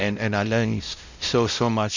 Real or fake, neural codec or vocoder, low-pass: fake; codec, 16 kHz, 0.5 kbps, X-Codec, WavLM features, trained on Multilingual LibriSpeech; 7.2 kHz